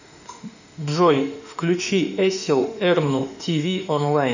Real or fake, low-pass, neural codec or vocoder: fake; 7.2 kHz; autoencoder, 48 kHz, 32 numbers a frame, DAC-VAE, trained on Japanese speech